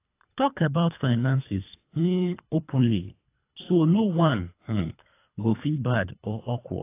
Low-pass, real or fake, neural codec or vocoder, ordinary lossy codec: 3.6 kHz; fake; codec, 24 kHz, 3 kbps, HILCodec; AAC, 24 kbps